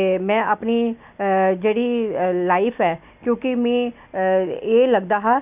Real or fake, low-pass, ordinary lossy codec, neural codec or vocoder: real; 3.6 kHz; none; none